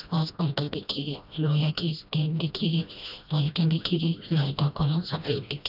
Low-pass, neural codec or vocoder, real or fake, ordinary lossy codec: 5.4 kHz; codec, 16 kHz, 1 kbps, FreqCodec, smaller model; fake; none